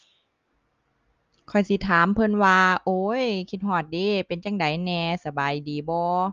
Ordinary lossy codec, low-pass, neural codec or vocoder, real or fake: Opus, 32 kbps; 7.2 kHz; none; real